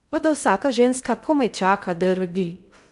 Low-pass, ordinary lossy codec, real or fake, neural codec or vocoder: 10.8 kHz; none; fake; codec, 16 kHz in and 24 kHz out, 0.6 kbps, FocalCodec, streaming, 2048 codes